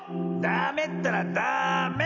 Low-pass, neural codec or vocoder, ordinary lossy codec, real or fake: 7.2 kHz; none; none; real